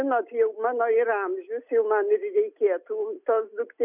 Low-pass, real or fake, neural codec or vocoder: 3.6 kHz; real; none